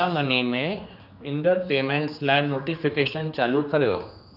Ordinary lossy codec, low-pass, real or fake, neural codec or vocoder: none; 5.4 kHz; fake; codec, 16 kHz, 2 kbps, X-Codec, HuBERT features, trained on general audio